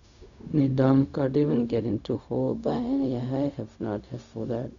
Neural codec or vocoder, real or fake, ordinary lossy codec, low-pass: codec, 16 kHz, 0.4 kbps, LongCat-Audio-Codec; fake; none; 7.2 kHz